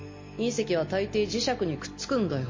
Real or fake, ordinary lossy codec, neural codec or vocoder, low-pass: real; MP3, 32 kbps; none; 7.2 kHz